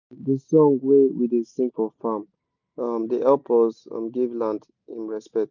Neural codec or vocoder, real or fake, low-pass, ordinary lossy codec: none; real; 7.2 kHz; none